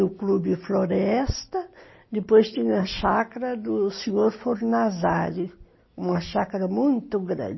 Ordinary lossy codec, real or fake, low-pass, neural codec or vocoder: MP3, 24 kbps; real; 7.2 kHz; none